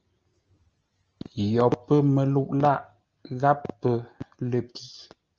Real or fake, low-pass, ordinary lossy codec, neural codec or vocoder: real; 7.2 kHz; Opus, 24 kbps; none